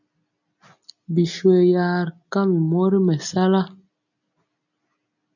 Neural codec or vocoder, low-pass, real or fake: none; 7.2 kHz; real